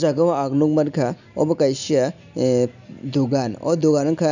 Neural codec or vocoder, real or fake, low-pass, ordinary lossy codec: none; real; 7.2 kHz; none